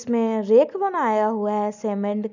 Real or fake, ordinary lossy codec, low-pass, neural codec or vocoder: real; none; 7.2 kHz; none